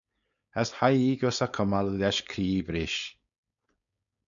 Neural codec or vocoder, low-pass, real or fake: codec, 16 kHz, 4.8 kbps, FACodec; 7.2 kHz; fake